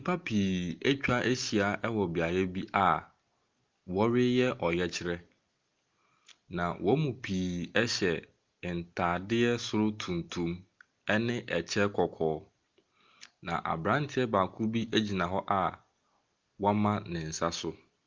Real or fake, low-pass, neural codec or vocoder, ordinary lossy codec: real; 7.2 kHz; none; Opus, 32 kbps